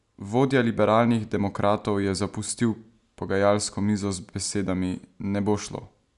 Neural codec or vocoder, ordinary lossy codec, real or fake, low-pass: none; none; real; 10.8 kHz